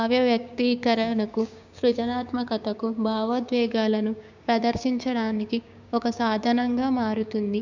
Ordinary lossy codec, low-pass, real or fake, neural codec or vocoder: none; 7.2 kHz; fake; codec, 16 kHz, 6 kbps, DAC